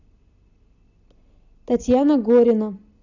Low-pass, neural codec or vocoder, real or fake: 7.2 kHz; none; real